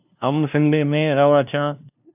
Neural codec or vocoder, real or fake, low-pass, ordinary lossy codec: codec, 16 kHz, 0.5 kbps, FunCodec, trained on LibriTTS, 25 frames a second; fake; 3.6 kHz; AAC, 32 kbps